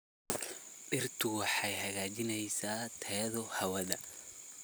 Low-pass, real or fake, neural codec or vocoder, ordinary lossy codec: none; real; none; none